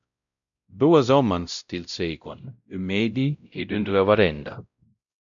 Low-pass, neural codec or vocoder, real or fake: 7.2 kHz; codec, 16 kHz, 0.5 kbps, X-Codec, WavLM features, trained on Multilingual LibriSpeech; fake